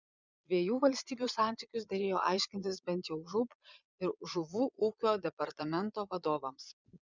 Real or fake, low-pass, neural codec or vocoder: fake; 7.2 kHz; vocoder, 22.05 kHz, 80 mel bands, Vocos